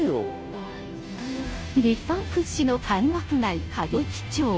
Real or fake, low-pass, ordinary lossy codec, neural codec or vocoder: fake; none; none; codec, 16 kHz, 0.5 kbps, FunCodec, trained on Chinese and English, 25 frames a second